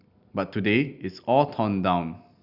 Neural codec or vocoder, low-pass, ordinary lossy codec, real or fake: none; 5.4 kHz; Opus, 64 kbps; real